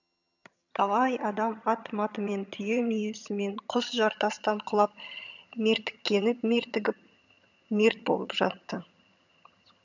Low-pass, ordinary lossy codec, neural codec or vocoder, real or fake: 7.2 kHz; none; vocoder, 22.05 kHz, 80 mel bands, HiFi-GAN; fake